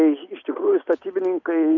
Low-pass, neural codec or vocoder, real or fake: 7.2 kHz; none; real